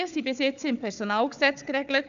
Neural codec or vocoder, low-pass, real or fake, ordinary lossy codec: codec, 16 kHz, 4 kbps, FunCodec, trained on Chinese and English, 50 frames a second; 7.2 kHz; fake; none